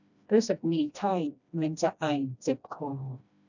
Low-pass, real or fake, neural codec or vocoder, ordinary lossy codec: 7.2 kHz; fake; codec, 16 kHz, 1 kbps, FreqCodec, smaller model; none